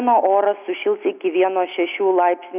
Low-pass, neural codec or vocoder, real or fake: 3.6 kHz; none; real